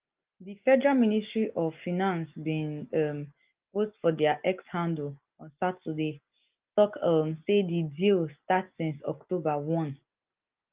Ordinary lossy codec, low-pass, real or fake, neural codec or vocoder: Opus, 32 kbps; 3.6 kHz; real; none